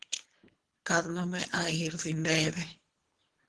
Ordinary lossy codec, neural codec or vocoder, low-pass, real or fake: Opus, 16 kbps; codec, 24 kHz, 3 kbps, HILCodec; 10.8 kHz; fake